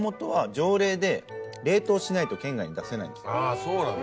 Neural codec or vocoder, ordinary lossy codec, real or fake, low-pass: none; none; real; none